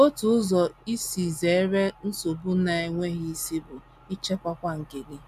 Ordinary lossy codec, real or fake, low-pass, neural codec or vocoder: AAC, 96 kbps; real; 14.4 kHz; none